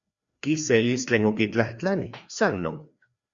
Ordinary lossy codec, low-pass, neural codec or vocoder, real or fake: Opus, 64 kbps; 7.2 kHz; codec, 16 kHz, 2 kbps, FreqCodec, larger model; fake